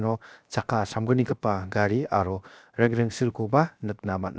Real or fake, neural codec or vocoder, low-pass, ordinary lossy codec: fake; codec, 16 kHz, 0.7 kbps, FocalCodec; none; none